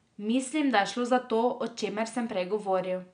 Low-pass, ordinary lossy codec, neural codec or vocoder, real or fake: 9.9 kHz; none; none; real